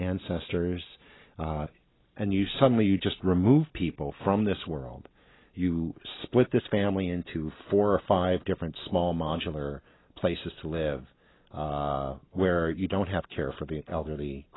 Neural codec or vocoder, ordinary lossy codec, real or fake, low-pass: none; AAC, 16 kbps; real; 7.2 kHz